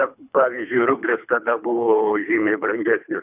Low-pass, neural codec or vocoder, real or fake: 3.6 kHz; codec, 24 kHz, 3 kbps, HILCodec; fake